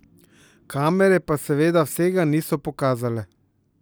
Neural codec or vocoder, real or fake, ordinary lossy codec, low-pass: none; real; none; none